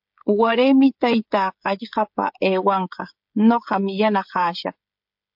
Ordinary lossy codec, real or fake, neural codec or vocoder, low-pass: MP3, 48 kbps; fake; codec, 16 kHz, 16 kbps, FreqCodec, smaller model; 5.4 kHz